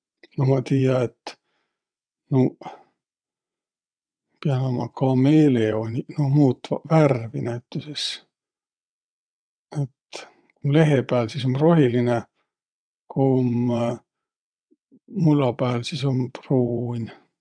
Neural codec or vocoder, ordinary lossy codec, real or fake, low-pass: vocoder, 22.05 kHz, 80 mel bands, WaveNeXt; none; fake; 9.9 kHz